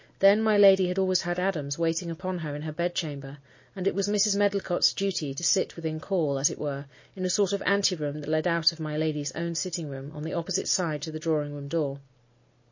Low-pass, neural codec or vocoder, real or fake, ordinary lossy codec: 7.2 kHz; none; real; MP3, 32 kbps